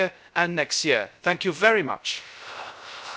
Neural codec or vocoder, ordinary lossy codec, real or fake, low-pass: codec, 16 kHz, 0.3 kbps, FocalCodec; none; fake; none